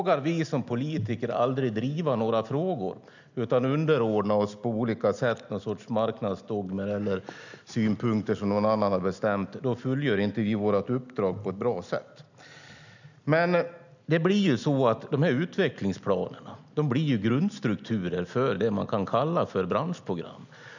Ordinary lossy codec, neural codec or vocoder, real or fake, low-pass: none; none; real; 7.2 kHz